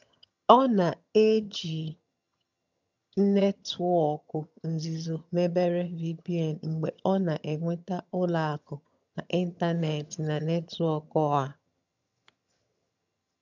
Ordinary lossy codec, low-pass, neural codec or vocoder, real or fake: none; 7.2 kHz; vocoder, 22.05 kHz, 80 mel bands, HiFi-GAN; fake